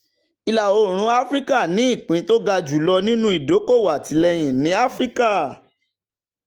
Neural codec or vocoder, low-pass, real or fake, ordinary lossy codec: autoencoder, 48 kHz, 128 numbers a frame, DAC-VAE, trained on Japanese speech; 19.8 kHz; fake; Opus, 24 kbps